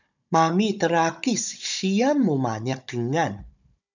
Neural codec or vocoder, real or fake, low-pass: codec, 16 kHz, 16 kbps, FunCodec, trained on Chinese and English, 50 frames a second; fake; 7.2 kHz